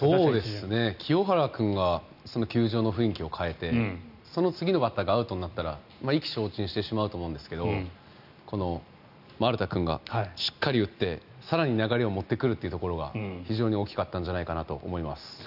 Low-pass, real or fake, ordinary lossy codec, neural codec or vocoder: 5.4 kHz; real; none; none